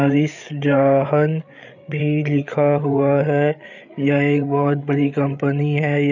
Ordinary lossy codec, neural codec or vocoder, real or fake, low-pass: none; codec, 16 kHz, 8 kbps, FreqCodec, larger model; fake; 7.2 kHz